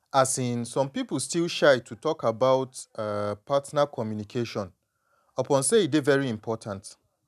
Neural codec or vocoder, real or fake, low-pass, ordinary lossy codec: none; real; 14.4 kHz; none